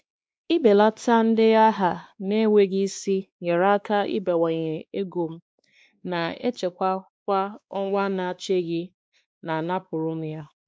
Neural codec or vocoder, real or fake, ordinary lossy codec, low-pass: codec, 16 kHz, 2 kbps, X-Codec, WavLM features, trained on Multilingual LibriSpeech; fake; none; none